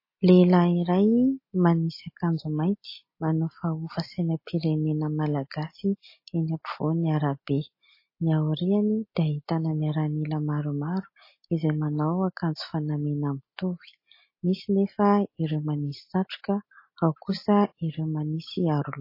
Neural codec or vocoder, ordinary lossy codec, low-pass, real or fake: none; MP3, 24 kbps; 5.4 kHz; real